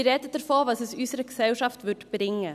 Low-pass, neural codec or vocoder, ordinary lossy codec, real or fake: 14.4 kHz; none; none; real